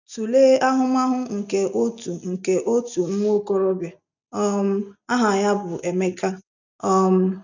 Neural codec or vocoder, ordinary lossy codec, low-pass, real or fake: none; none; 7.2 kHz; real